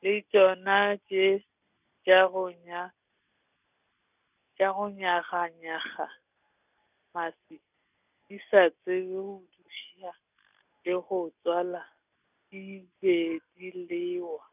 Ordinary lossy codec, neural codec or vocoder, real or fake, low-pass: none; none; real; 3.6 kHz